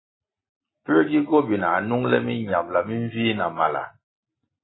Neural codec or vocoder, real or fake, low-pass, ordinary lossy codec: none; real; 7.2 kHz; AAC, 16 kbps